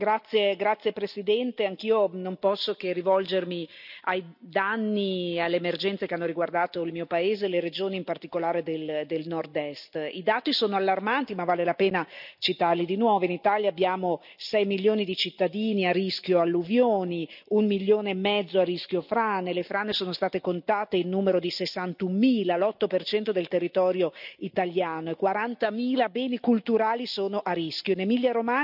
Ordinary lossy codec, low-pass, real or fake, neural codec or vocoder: none; 5.4 kHz; real; none